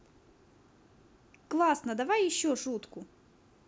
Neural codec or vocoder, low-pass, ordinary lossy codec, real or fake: none; none; none; real